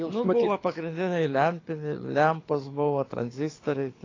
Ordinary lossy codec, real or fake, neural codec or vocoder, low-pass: AAC, 32 kbps; fake; codec, 24 kHz, 6 kbps, HILCodec; 7.2 kHz